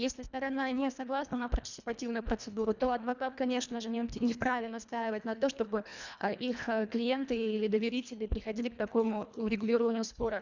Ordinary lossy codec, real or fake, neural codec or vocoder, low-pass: none; fake; codec, 24 kHz, 1.5 kbps, HILCodec; 7.2 kHz